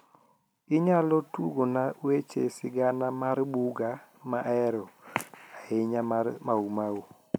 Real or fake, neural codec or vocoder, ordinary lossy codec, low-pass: real; none; none; none